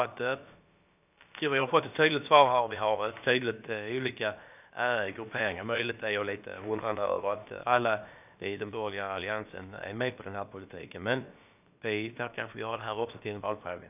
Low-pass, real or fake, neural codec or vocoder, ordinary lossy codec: 3.6 kHz; fake; codec, 16 kHz, about 1 kbps, DyCAST, with the encoder's durations; none